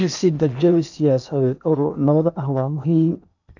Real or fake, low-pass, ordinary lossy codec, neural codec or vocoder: fake; 7.2 kHz; none; codec, 16 kHz in and 24 kHz out, 0.8 kbps, FocalCodec, streaming, 65536 codes